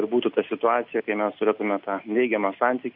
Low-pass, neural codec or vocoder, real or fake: 5.4 kHz; none; real